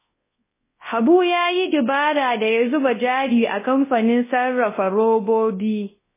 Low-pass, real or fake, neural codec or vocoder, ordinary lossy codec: 3.6 kHz; fake; codec, 24 kHz, 0.9 kbps, WavTokenizer, large speech release; MP3, 16 kbps